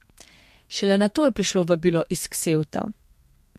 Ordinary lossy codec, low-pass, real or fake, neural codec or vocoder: MP3, 64 kbps; 14.4 kHz; fake; codec, 32 kHz, 1.9 kbps, SNAC